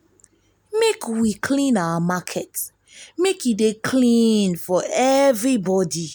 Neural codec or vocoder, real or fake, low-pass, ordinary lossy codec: none; real; none; none